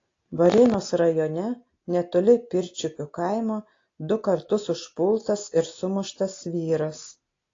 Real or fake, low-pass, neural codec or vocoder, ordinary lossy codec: real; 7.2 kHz; none; AAC, 32 kbps